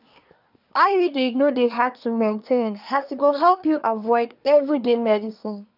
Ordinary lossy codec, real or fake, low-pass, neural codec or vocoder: none; fake; 5.4 kHz; codec, 24 kHz, 1 kbps, SNAC